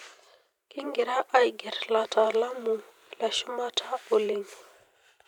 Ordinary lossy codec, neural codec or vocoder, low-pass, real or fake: none; vocoder, 44.1 kHz, 128 mel bands every 512 samples, BigVGAN v2; 19.8 kHz; fake